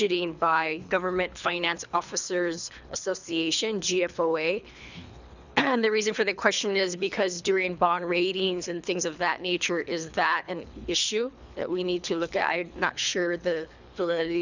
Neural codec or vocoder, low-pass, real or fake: codec, 24 kHz, 3 kbps, HILCodec; 7.2 kHz; fake